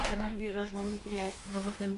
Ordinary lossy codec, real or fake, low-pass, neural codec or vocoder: AAC, 48 kbps; fake; 10.8 kHz; codec, 24 kHz, 1 kbps, SNAC